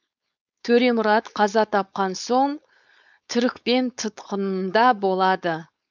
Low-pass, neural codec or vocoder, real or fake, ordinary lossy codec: 7.2 kHz; codec, 16 kHz, 4.8 kbps, FACodec; fake; none